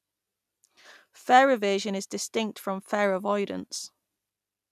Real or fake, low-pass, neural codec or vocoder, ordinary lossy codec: real; 14.4 kHz; none; none